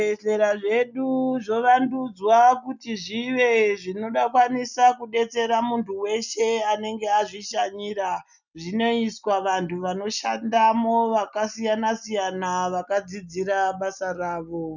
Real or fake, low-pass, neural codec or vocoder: real; 7.2 kHz; none